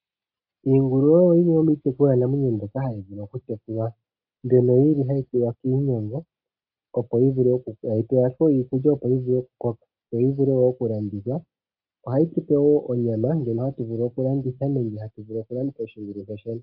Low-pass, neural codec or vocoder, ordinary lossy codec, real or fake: 5.4 kHz; none; AAC, 48 kbps; real